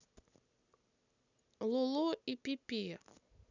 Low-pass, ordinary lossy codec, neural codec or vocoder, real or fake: 7.2 kHz; none; none; real